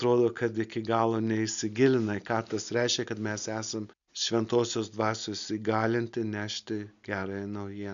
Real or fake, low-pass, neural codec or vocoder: real; 7.2 kHz; none